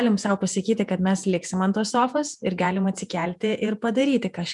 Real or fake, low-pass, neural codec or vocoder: fake; 10.8 kHz; vocoder, 48 kHz, 128 mel bands, Vocos